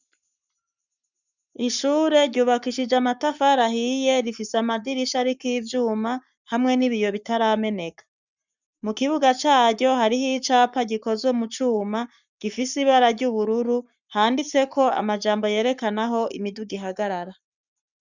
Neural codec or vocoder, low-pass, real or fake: codec, 44.1 kHz, 7.8 kbps, Pupu-Codec; 7.2 kHz; fake